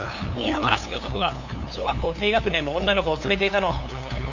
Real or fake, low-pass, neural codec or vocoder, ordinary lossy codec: fake; 7.2 kHz; codec, 16 kHz, 2 kbps, FunCodec, trained on LibriTTS, 25 frames a second; none